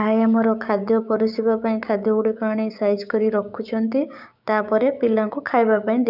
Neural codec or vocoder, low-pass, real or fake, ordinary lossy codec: codec, 16 kHz, 6 kbps, DAC; 5.4 kHz; fake; none